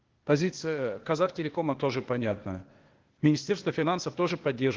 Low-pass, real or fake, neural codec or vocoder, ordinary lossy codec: 7.2 kHz; fake; codec, 16 kHz, 0.8 kbps, ZipCodec; Opus, 16 kbps